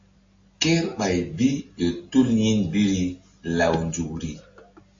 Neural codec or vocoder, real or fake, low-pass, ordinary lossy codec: none; real; 7.2 kHz; AAC, 48 kbps